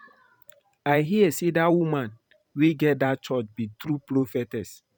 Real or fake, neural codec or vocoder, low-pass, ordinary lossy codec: fake; vocoder, 44.1 kHz, 128 mel bands every 512 samples, BigVGAN v2; 19.8 kHz; none